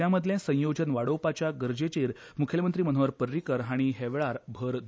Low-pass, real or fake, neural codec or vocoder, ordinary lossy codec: none; real; none; none